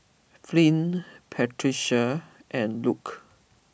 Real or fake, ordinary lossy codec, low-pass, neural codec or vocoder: real; none; none; none